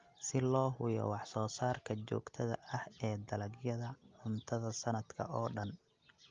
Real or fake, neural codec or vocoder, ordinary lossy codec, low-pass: real; none; Opus, 32 kbps; 7.2 kHz